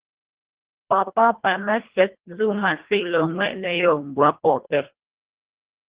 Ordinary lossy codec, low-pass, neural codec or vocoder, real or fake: Opus, 16 kbps; 3.6 kHz; codec, 24 kHz, 1.5 kbps, HILCodec; fake